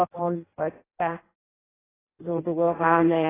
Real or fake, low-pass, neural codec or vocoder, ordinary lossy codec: fake; 3.6 kHz; codec, 16 kHz in and 24 kHz out, 0.6 kbps, FireRedTTS-2 codec; AAC, 16 kbps